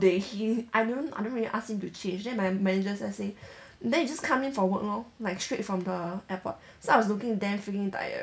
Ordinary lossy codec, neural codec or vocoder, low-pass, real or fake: none; none; none; real